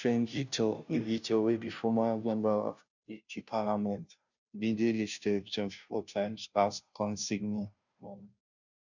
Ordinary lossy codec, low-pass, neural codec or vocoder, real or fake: none; 7.2 kHz; codec, 16 kHz, 0.5 kbps, FunCodec, trained on Chinese and English, 25 frames a second; fake